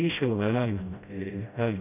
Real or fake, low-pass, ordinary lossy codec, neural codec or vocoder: fake; 3.6 kHz; none; codec, 16 kHz, 0.5 kbps, FreqCodec, smaller model